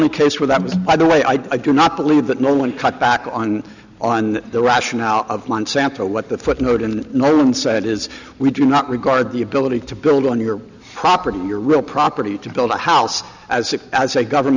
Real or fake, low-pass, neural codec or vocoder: real; 7.2 kHz; none